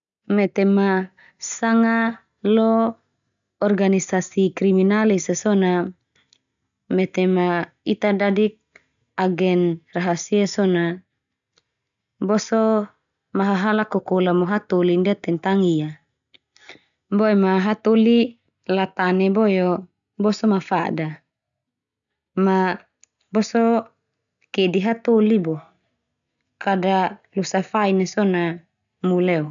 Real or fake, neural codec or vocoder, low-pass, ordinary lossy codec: real; none; 7.2 kHz; none